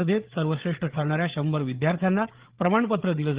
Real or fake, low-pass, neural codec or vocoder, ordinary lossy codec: fake; 3.6 kHz; codec, 16 kHz, 8 kbps, FreqCodec, larger model; Opus, 16 kbps